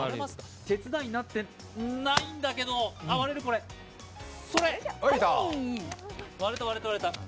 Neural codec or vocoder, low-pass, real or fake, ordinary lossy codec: none; none; real; none